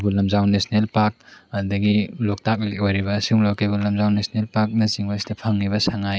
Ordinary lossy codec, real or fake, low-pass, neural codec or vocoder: none; real; none; none